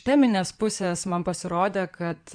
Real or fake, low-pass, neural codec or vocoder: fake; 9.9 kHz; codec, 16 kHz in and 24 kHz out, 2.2 kbps, FireRedTTS-2 codec